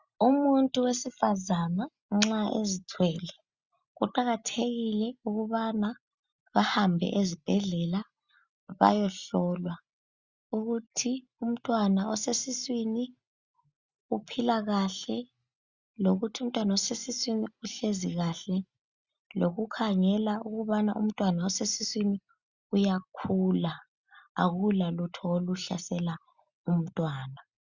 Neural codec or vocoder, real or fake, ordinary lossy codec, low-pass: none; real; Opus, 64 kbps; 7.2 kHz